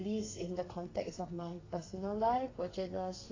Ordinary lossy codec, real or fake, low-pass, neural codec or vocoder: AAC, 32 kbps; fake; 7.2 kHz; codec, 32 kHz, 1.9 kbps, SNAC